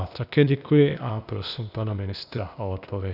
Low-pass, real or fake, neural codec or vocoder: 5.4 kHz; fake; codec, 16 kHz, 0.8 kbps, ZipCodec